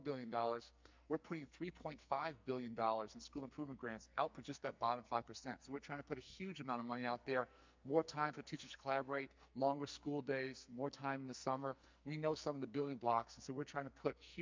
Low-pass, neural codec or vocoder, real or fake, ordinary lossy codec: 7.2 kHz; codec, 44.1 kHz, 2.6 kbps, SNAC; fake; MP3, 64 kbps